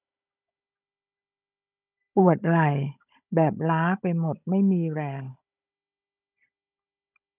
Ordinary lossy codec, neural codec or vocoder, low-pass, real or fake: none; codec, 16 kHz, 16 kbps, FunCodec, trained on Chinese and English, 50 frames a second; 3.6 kHz; fake